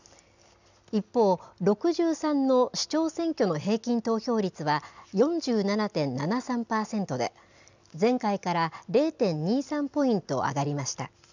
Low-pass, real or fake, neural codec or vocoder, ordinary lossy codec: 7.2 kHz; real; none; none